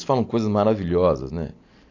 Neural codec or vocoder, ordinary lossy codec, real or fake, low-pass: none; AAC, 48 kbps; real; 7.2 kHz